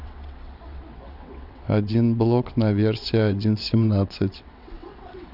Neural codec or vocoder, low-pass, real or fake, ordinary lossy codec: vocoder, 22.05 kHz, 80 mel bands, WaveNeXt; 5.4 kHz; fake; none